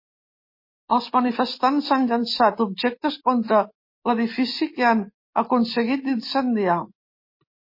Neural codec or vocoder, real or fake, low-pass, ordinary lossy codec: none; real; 5.4 kHz; MP3, 24 kbps